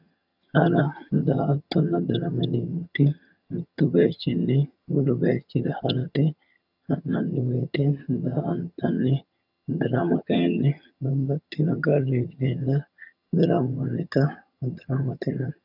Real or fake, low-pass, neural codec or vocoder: fake; 5.4 kHz; vocoder, 22.05 kHz, 80 mel bands, HiFi-GAN